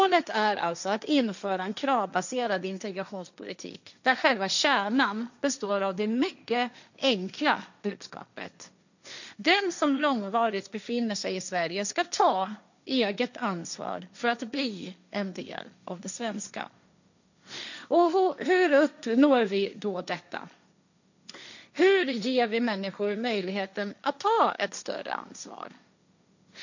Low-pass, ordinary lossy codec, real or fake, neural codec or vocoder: 7.2 kHz; none; fake; codec, 16 kHz, 1.1 kbps, Voila-Tokenizer